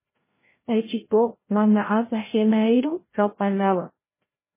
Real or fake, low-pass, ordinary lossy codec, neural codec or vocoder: fake; 3.6 kHz; MP3, 16 kbps; codec, 16 kHz, 0.5 kbps, FreqCodec, larger model